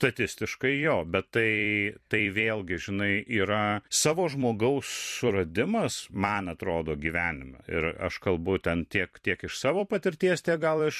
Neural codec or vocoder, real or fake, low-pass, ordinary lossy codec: vocoder, 44.1 kHz, 128 mel bands every 256 samples, BigVGAN v2; fake; 14.4 kHz; MP3, 64 kbps